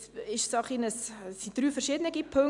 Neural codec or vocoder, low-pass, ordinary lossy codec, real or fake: none; 10.8 kHz; none; real